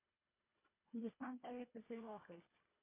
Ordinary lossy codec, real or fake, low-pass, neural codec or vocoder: MP3, 32 kbps; fake; 3.6 kHz; codec, 24 kHz, 1.5 kbps, HILCodec